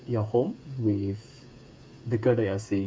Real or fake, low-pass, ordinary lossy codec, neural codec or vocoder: fake; none; none; codec, 16 kHz, 8 kbps, FreqCodec, smaller model